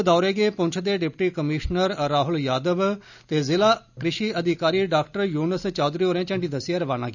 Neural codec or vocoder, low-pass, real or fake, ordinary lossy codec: none; 7.2 kHz; real; none